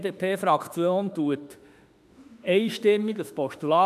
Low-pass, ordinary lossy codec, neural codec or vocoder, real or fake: 14.4 kHz; none; autoencoder, 48 kHz, 32 numbers a frame, DAC-VAE, trained on Japanese speech; fake